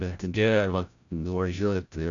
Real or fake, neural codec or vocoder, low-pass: fake; codec, 16 kHz, 0.5 kbps, FreqCodec, larger model; 7.2 kHz